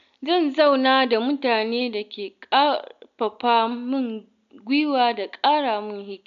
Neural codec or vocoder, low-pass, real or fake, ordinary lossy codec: none; 7.2 kHz; real; none